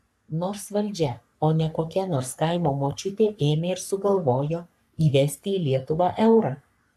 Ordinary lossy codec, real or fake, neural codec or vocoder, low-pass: AAC, 96 kbps; fake; codec, 44.1 kHz, 3.4 kbps, Pupu-Codec; 14.4 kHz